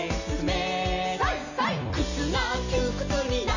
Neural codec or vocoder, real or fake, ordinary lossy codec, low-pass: none; real; none; 7.2 kHz